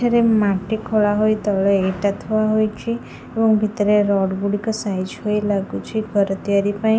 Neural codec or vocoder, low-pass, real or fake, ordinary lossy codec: none; none; real; none